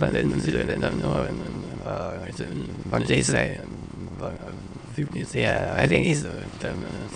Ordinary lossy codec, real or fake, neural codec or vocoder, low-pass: none; fake; autoencoder, 22.05 kHz, a latent of 192 numbers a frame, VITS, trained on many speakers; 9.9 kHz